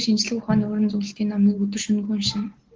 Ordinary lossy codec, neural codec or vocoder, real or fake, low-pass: Opus, 16 kbps; none; real; 7.2 kHz